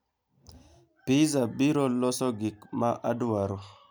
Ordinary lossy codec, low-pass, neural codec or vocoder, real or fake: none; none; none; real